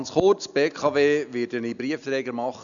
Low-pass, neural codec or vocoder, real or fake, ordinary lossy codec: 7.2 kHz; none; real; none